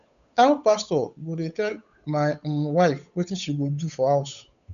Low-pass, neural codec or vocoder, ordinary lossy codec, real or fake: 7.2 kHz; codec, 16 kHz, 8 kbps, FunCodec, trained on Chinese and English, 25 frames a second; none; fake